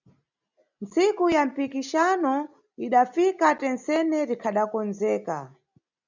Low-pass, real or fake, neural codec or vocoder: 7.2 kHz; real; none